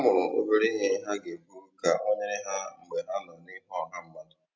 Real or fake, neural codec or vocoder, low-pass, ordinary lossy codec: real; none; none; none